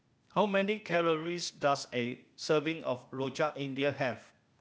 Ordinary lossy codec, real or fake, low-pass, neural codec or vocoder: none; fake; none; codec, 16 kHz, 0.8 kbps, ZipCodec